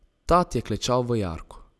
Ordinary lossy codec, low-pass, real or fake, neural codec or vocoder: none; none; real; none